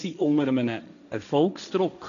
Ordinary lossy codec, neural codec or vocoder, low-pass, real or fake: MP3, 96 kbps; codec, 16 kHz, 1.1 kbps, Voila-Tokenizer; 7.2 kHz; fake